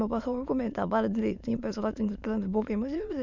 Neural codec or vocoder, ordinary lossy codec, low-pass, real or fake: autoencoder, 22.05 kHz, a latent of 192 numbers a frame, VITS, trained on many speakers; none; 7.2 kHz; fake